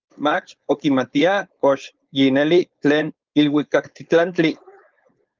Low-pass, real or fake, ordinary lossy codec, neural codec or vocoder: 7.2 kHz; fake; Opus, 24 kbps; codec, 16 kHz, 4.8 kbps, FACodec